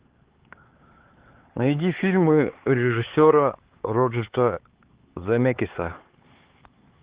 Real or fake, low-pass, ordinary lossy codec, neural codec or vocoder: fake; 3.6 kHz; Opus, 16 kbps; codec, 16 kHz, 4 kbps, X-Codec, HuBERT features, trained on LibriSpeech